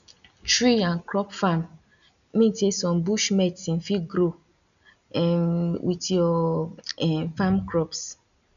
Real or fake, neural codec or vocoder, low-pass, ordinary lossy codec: real; none; 7.2 kHz; none